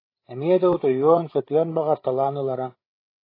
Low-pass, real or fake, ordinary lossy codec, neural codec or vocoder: 5.4 kHz; fake; AAC, 24 kbps; codec, 16 kHz, 16 kbps, FreqCodec, larger model